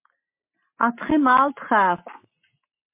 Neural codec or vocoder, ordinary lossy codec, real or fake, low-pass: none; MP3, 24 kbps; real; 3.6 kHz